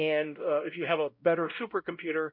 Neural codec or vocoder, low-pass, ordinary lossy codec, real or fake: codec, 16 kHz, 0.5 kbps, X-Codec, WavLM features, trained on Multilingual LibriSpeech; 5.4 kHz; MP3, 48 kbps; fake